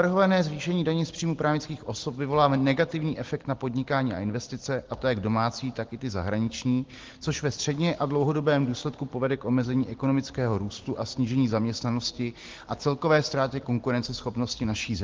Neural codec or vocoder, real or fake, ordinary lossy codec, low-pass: none; real; Opus, 16 kbps; 7.2 kHz